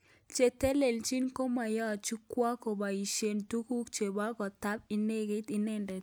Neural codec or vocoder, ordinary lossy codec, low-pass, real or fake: none; none; none; real